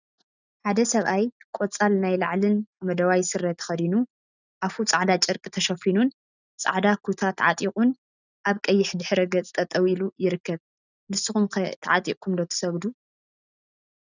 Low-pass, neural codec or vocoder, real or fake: 7.2 kHz; none; real